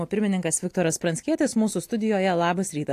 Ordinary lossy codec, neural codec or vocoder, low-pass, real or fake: AAC, 64 kbps; none; 14.4 kHz; real